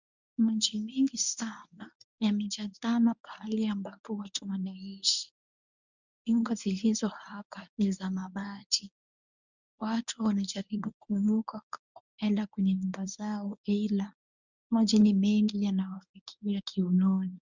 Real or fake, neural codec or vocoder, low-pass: fake; codec, 24 kHz, 0.9 kbps, WavTokenizer, medium speech release version 1; 7.2 kHz